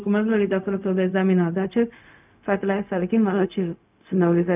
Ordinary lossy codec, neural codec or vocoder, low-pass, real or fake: none; codec, 16 kHz, 0.4 kbps, LongCat-Audio-Codec; 3.6 kHz; fake